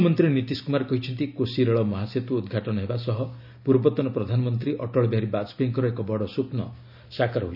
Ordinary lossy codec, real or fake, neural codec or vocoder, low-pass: none; real; none; 5.4 kHz